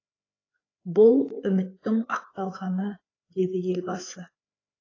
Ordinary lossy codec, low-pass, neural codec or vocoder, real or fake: AAC, 32 kbps; 7.2 kHz; codec, 16 kHz, 4 kbps, FreqCodec, larger model; fake